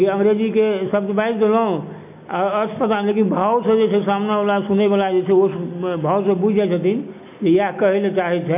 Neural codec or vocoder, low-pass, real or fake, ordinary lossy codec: none; 3.6 kHz; real; none